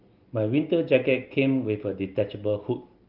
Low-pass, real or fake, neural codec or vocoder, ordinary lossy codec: 5.4 kHz; real; none; Opus, 32 kbps